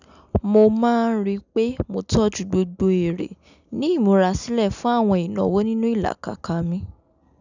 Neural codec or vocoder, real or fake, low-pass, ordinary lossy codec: none; real; 7.2 kHz; none